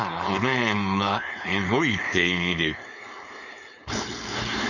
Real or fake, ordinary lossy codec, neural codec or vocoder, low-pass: fake; none; codec, 16 kHz, 4.8 kbps, FACodec; 7.2 kHz